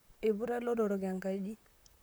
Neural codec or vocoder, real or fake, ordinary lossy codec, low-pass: vocoder, 44.1 kHz, 128 mel bands, Pupu-Vocoder; fake; none; none